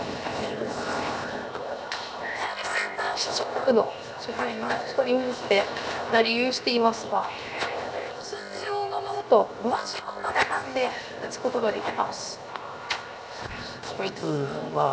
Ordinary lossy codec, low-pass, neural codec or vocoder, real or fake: none; none; codec, 16 kHz, 0.7 kbps, FocalCodec; fake